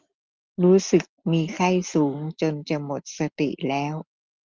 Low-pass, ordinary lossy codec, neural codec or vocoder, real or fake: 7.2 kHz; Opus, 32 kbps; none; real